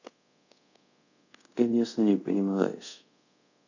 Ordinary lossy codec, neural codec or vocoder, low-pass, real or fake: none; codec, 24 kHz, 0.5 kbps, DualCodec; 7.2 kHz; fake